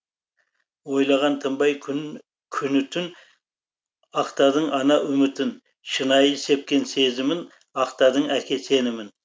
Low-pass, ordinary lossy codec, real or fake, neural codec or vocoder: none; none; real; none